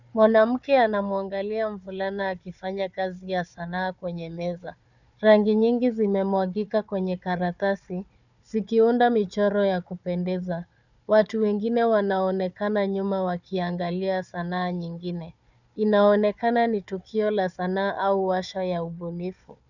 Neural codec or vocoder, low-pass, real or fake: codec, 16 kHz, 4 kbps, FunCodec, trained on Chinese and English, 50 frames a second; 7.2 kHz; fake